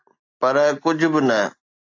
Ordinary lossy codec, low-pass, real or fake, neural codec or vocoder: AAC, 48 kbps; 7.2 kHz; real; none